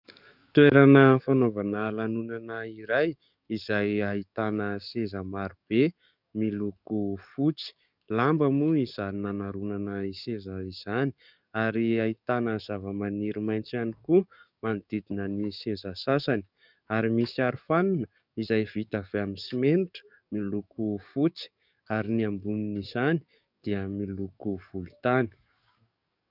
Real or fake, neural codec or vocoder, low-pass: fake; codec, 44.1 kHz, 7.8 kbps, DAC; 5.4 kHz